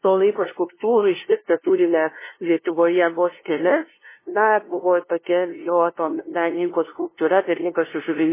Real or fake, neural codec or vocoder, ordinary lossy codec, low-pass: fake; codec, 16 kHz, 0.5 kbps, FunCodec, trained on LibriTTS, 25 frames a second; MP3, 16 kbps; 3.6 kHz